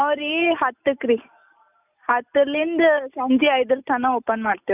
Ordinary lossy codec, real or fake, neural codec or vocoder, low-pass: none; real; none; 3.6 kHz